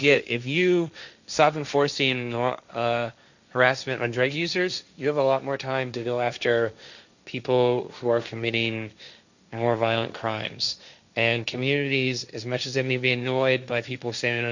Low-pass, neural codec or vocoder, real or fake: 7.2 kHz; codec, 16 kHz, 1.1 kbps, Voila-Tokenizer; fake